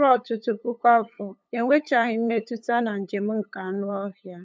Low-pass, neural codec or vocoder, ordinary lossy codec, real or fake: none; codec, 16 kHz, 8 kbps, FunCodec, trained on LibriTTS, 25 frames a second; none; fake